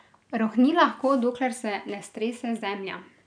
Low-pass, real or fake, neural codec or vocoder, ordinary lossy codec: 9.9 kHz; real; none; none